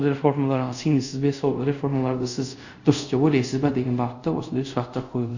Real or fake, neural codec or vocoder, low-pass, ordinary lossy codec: fake; codec, 24 kHz, 0.5 kbps, DualCodec; 7.2 kHz; none